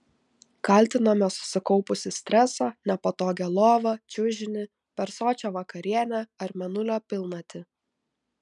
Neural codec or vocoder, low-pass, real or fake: none; 10.8 kHz; real